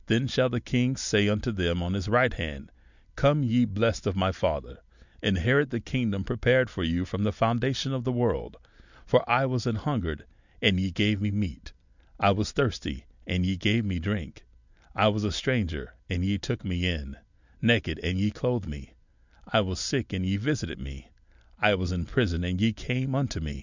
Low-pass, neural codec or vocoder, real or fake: 7.2 kHz; none; real